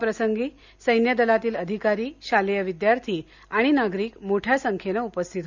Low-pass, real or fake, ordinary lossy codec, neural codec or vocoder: 7.2 kHz; real; none; none